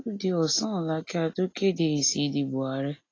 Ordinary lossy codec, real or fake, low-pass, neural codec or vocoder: AAC, 32 kbps; real; 7.2 kHz; none